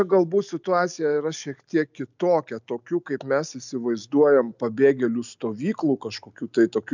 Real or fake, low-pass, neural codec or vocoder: real; 7.2 kHz; none